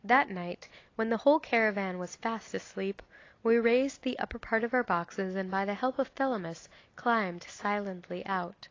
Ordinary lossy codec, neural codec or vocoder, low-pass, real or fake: AAC, 32 kbps; none; 7.2 kHz; real